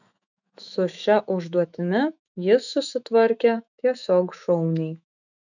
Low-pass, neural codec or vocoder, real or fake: 7.2 kHz; autoencoder, 48 kHz, 128 numbers a frame, DAC-VAE, trained on Japanese speech; fake